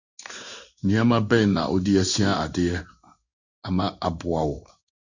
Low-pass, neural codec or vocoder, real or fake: 7.2 kHz; codec, 16 kHz in and 24 kHz out, 1 kbps, XY-Tokenizer; fake